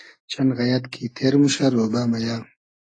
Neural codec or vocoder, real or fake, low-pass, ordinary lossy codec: none; real; 9.9 kHz; AAC, 32 kbps